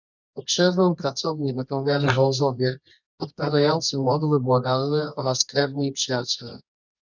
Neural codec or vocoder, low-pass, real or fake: codec, 24 kHz, 0.9 kbps, WavTokenizer, medium music audio release; 7.2 kHz; fake